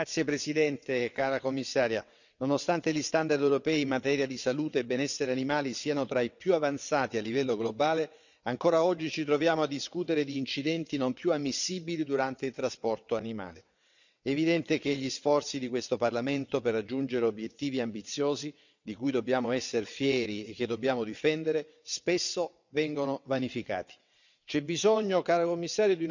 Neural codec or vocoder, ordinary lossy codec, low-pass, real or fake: vocoder, 22.05 kHz, 80 mel bands, WaveNeXt; none; 7.2 kHz; fake